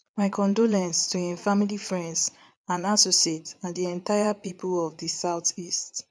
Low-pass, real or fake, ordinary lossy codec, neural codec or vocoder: none; fake; none; vocoder, 22.05 kHz, 80 mel bands, Vocos